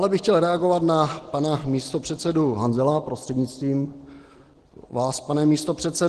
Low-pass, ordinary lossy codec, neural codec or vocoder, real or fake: 10.8 kHz; Opus, 16 kbps; none; real